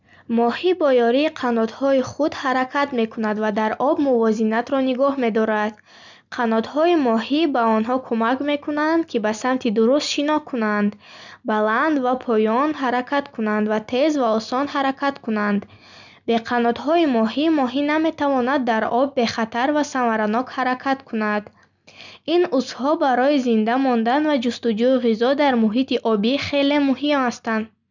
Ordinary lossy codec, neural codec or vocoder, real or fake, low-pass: none; none; real; 7.2 kHz